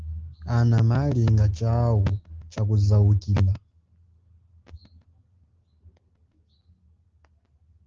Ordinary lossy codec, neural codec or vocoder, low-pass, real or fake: Opus, 16 kbps; none; 7.2 kHz; real